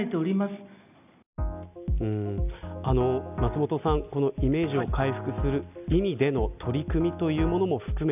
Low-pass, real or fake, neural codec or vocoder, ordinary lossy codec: 3.6 kHz; real; none; none